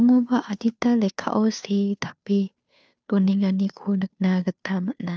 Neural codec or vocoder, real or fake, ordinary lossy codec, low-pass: codec, 16 kHz, 2 kbps, FunCodec, trained on Chinese and English, 25 frames a second; fake; none; none